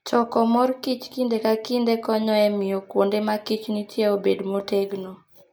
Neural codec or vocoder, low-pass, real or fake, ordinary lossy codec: none; none; real; none